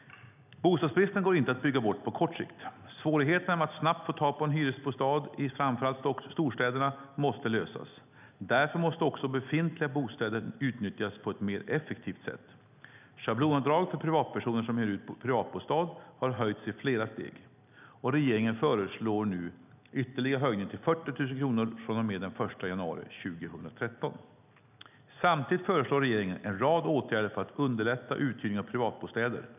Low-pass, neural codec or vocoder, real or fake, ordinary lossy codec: 3.6 kHz; none; real; none